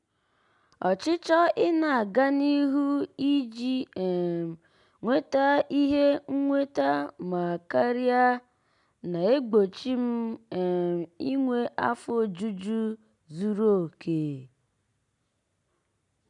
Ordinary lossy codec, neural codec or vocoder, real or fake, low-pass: none; none; real; 10.8 kHz